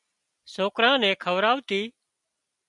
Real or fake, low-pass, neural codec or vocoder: real; 10.8 kHz; none